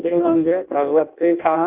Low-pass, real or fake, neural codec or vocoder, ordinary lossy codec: 3.6 kHz; fake; codec, 16 kHz in and 24 kHz out, 0.6 kbps, FireRedTTS-2 codec; Opus, 24 kbps